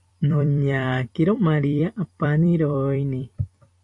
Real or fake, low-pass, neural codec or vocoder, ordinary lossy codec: fake; 10.8 kHz; vocoder, 44.1 kHz, 128 mel bands every 256 samples, BigVGAN v2; MP3, 48 kbps